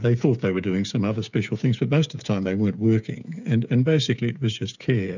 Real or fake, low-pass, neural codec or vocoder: fake; 7.2 kHz; codec, 16 kHz, 8 kbps, FreqCodec, smaller model